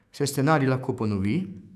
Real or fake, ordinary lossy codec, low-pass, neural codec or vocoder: fake; none; 14.4 kHz; autoencoder, 48 kHz, 128 numbers a frame, DAC-VAE, trained on Japanese speech